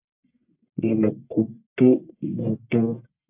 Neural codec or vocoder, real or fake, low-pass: codec, 44.1 kHz, 1.7 kbps, Pupu-Codec; fake; 3.6 kHz